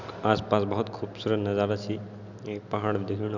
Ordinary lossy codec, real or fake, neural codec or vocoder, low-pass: none; real; none; 7.2 kHz